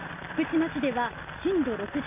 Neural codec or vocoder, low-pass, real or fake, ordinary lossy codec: none; 3.6 kHz; real; MP3, 24 kbps